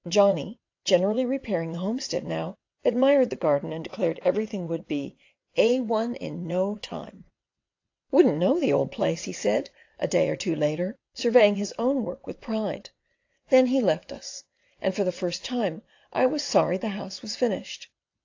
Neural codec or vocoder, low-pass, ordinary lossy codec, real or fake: vocoder, 22.05 kHz, 80 mel bands, WaveNeXt; 7.2 kHz; AAC, 48 kbps; fake